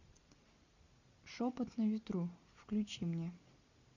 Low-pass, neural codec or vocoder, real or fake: 7.2 kHz; none; real